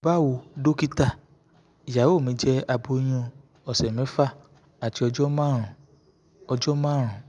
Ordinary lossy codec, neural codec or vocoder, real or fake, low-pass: none; none; real; 10.8 kHz